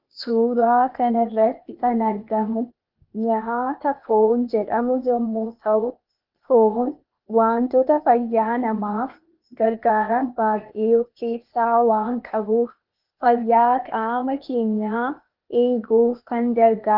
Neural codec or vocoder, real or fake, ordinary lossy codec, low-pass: codec, 16 kHz, 0.8 kbps, ZipCodec; fake; Opus, 32 kbps; 5.4 kHz